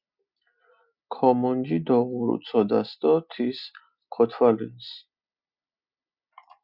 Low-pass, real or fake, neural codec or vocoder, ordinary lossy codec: 5.4 kHz; real; none; Opus, 64 kbps